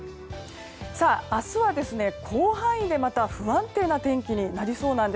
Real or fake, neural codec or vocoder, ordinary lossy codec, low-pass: real; none; none; none